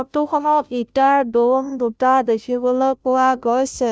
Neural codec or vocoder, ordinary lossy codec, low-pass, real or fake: codec, 16 kHz, 0.5 kbps, FunCodec, trained on Chinese and English, 25 frames a second; none; none; fake